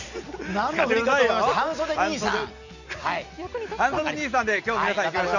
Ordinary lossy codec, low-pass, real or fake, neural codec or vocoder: none; 7.2 kHz; real; none